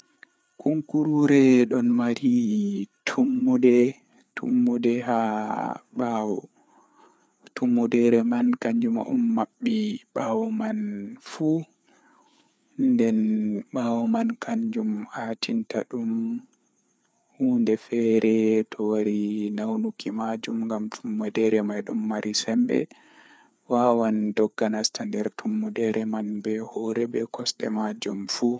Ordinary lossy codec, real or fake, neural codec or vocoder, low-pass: none; fake; codec, 16 kHz, 4 kbps, FreqCodec, larger model; none